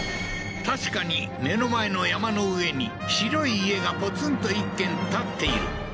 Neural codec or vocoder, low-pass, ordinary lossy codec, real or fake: none; none; none; real